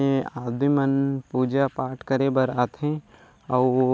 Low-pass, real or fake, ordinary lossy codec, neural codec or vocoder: none; real; none; none